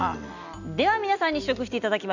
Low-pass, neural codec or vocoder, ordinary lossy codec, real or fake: 7.2 kHz; none; none; real